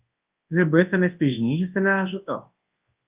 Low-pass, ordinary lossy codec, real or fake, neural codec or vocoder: 3.6 kHz; Opus, 24 kbps; fake; codec, 24 kHz, 0.9 kbps, WavTokenizer, large speech release